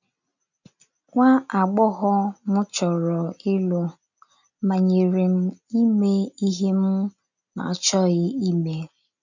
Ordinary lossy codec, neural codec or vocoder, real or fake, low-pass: none; none; real; 7.2 kHz